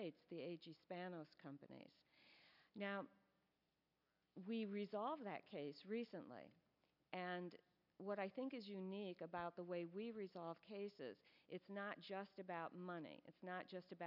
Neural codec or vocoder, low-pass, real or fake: none; 5.4 kHz; real